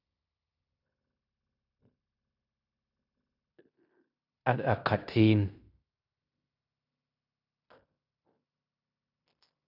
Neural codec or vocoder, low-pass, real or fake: codec, 16 kHz in and 24 kHz out, 0.9 kbps, LongCat-Audio-Codec, fine tuned four codebook decoder; 5.4 kHz; fake